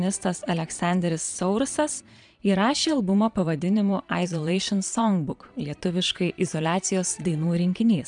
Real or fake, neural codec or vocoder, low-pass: fake; vocoder, 22.05 kHz, 80 mel bands, Vocos; 9.9 kHz